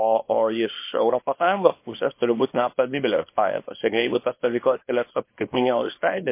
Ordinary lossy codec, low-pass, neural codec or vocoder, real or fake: MP3, 24 kbps; 3.6 kHz; codec, 24 kHz, 0.9 kbps, WavTokenizer, small release; fake